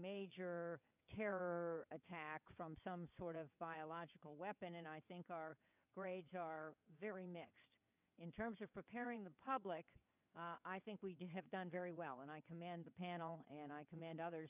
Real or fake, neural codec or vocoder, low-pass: fake; vocoder, 22.05 kHz, 80 mel bands, WaveNeXt; 3.6 kHz